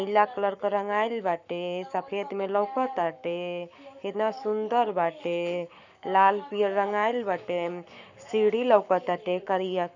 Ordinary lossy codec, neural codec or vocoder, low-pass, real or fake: none; codec, 44.1 kHz, 7.8 kbps, Pupu-Codec; 7.2 kHz; fake